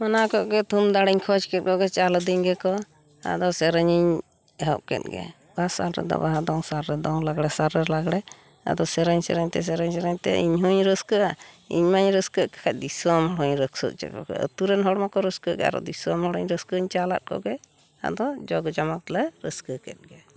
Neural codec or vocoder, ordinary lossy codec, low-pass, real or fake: none; none; none; real